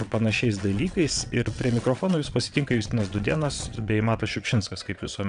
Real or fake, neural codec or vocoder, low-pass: fake; vocoder, 22.05 kHz, 80 mel bands, WaveNeXt; 9.9 kHz